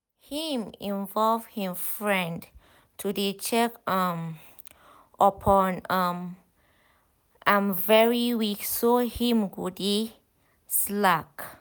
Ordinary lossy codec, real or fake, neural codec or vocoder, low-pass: none; real; none; none